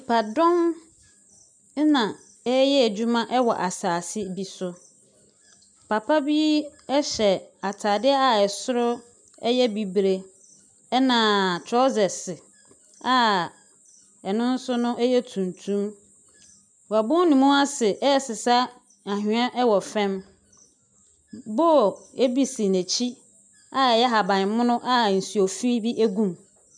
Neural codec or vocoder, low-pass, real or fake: none; 9.9 kHz; real